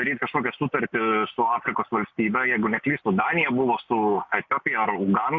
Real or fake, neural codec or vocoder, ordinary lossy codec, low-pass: real; none; MP3, 64 kbps; 7.2 kHz